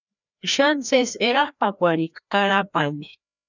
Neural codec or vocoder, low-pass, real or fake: codec, 16 kHz, 1 kbps, FreqCodec, larger model; 7.2 kHz; fake